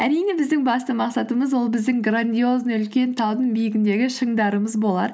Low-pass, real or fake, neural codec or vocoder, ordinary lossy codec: none; real; none; none